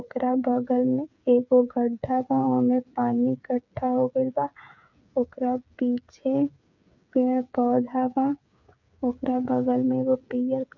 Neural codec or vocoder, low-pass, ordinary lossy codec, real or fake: codec, 16 kHz, 8 kbps, FreqCodec, smaller model; 7.2 kHz; none; fake